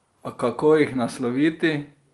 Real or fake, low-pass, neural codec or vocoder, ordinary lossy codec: real; 10.8 kHz; none; Opus, 32 kbps